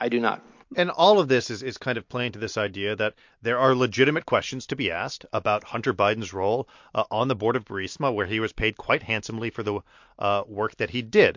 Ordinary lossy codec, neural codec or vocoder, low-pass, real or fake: MP3, 48 kbps; none; 7.2 kHz; real